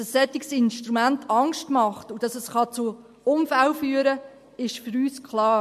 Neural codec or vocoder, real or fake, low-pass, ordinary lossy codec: none; real; 14.4 kHz; MP3, 64 kbps